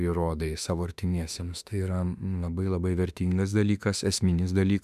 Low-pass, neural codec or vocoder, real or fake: 14.4 kHz; autoencoder, 48 kHz, 32 numbers a frame, DAC-VAE, trained on Japanese speech; fake